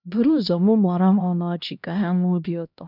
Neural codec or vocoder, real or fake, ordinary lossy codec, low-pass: codec, 16 kHz, 1 kbps, X-Codec, HuBERT features, trained on LibriSpeech; fake; none; 5.4 kHz